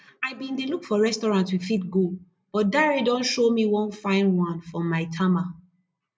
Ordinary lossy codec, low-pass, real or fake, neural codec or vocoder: none; none; real; none